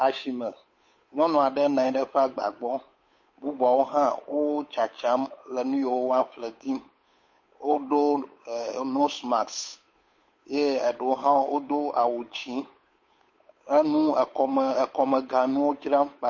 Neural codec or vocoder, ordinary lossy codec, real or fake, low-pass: codec, 16 kHz, 8 kbps, FunCodec, trained on Chinese and English, 25 frames a second; MP3, 32 kbps; fake; 7.2 kHz